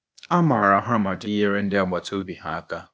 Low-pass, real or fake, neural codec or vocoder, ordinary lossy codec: none; fake; codec, 16 kHz, 0.8 kbps, ZipCodec; none